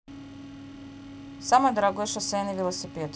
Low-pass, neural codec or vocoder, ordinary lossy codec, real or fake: none; none; none; real